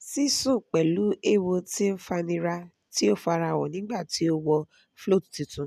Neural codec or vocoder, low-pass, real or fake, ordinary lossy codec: none; 14.4 kHz; real; none